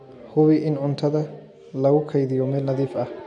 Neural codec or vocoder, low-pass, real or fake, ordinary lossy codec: none; 10.8 kHz; real; AAC, 64 kbps